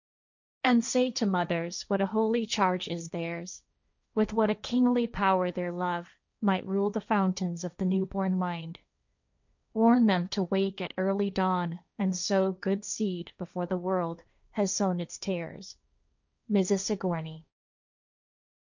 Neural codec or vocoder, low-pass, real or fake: codec, 16 kHz, 1.1 kbps, Voila-Tokenizer; 7.2 kHz; fake